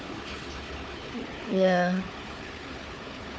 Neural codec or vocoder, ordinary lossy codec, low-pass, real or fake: codec, 16 kHz, 4 kbps, FunCodec, trained on Chinese and English, 50 frames a second; none; none; fake